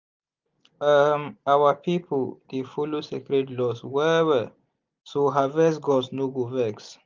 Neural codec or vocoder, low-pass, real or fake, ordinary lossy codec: none; 7.2 kHz; real; Opus, 24 kbps